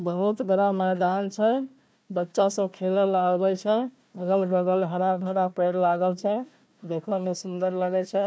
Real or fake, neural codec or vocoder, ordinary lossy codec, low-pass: fake; codec, 16 kHz, 1 kbps, FunCodec, trained on Chinese and English, 50 frames a second; none; none